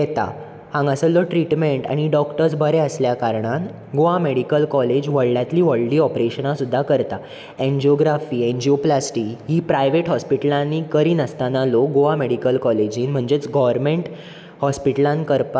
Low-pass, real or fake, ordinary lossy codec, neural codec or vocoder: none; real; none; none